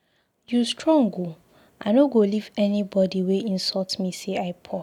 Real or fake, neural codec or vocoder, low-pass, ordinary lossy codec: real; none; 19.8 kHz; none